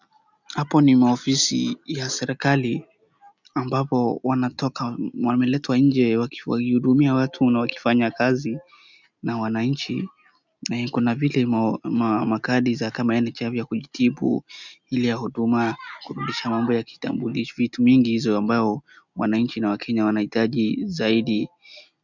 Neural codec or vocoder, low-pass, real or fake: none; 7.2 kHz; real